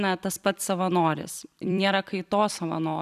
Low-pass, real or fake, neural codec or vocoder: 14.4 kHz; fake; vocoder, 44.1 kHz, 128 mel bands every 256 samples, BigVGAN v2